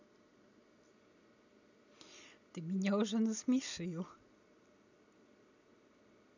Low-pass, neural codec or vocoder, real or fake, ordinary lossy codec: 7.2 kHz; none; real; none